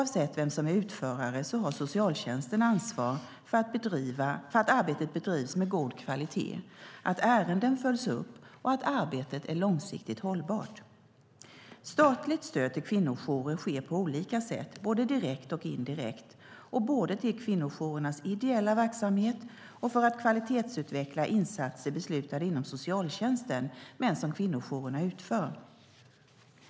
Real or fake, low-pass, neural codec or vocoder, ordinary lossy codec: real; none; none; none